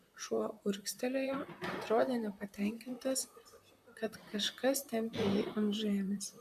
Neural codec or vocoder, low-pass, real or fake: vocoder, 44.1 kHz, 128 mel bands, Pupu-Vocoder; 14.4 kHz; fake